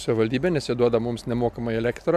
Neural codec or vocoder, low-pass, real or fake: none; 14.4 kHz; real